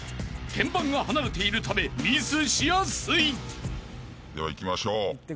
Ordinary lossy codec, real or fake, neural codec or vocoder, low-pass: none; real; none; none